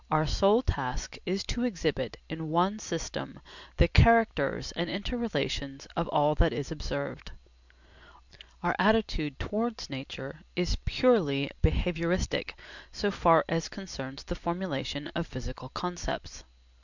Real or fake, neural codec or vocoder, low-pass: real; none; 7.2 kHz